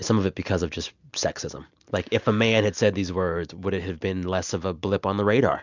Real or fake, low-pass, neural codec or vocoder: real; 7.2 kHz; none